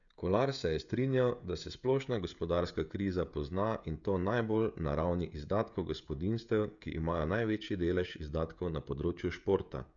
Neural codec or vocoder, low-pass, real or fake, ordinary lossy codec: codec, 16 kHz, 16 kbps, FreqCodec, smaller model; 7.2 kHz; fake; none